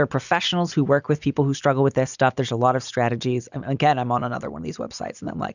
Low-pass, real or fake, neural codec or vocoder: 7.2 kHz; real; none